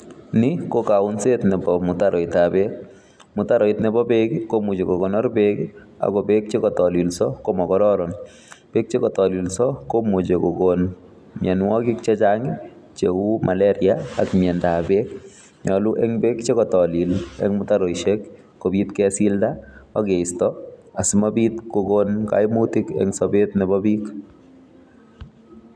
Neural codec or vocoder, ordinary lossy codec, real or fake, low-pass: none; none; real; none